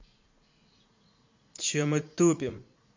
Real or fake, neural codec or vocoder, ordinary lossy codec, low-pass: fake; vocoder, 44.1 kHz, 80 mel bands, Vocos; MP3, 48 kbps; 7.2 kHz